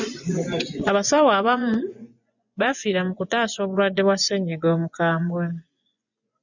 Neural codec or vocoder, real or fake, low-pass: vocoder, 24 kHz, 100 mel bands, Vocos; fake; 7.2 kHz